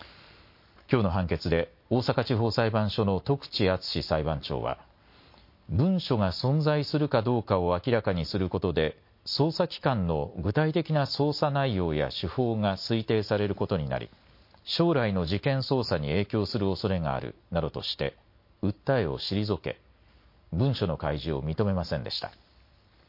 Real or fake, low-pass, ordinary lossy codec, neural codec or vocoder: real; 5.4 kHz; MP3, 32 kbps; none